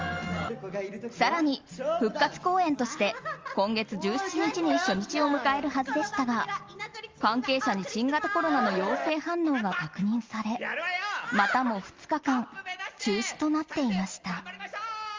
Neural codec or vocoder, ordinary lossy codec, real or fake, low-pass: none; Opus, 32 kbps; real; 7.2 kHz